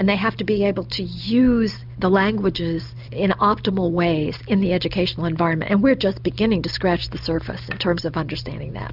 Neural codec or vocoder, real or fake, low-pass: none; real; 5.4 kHz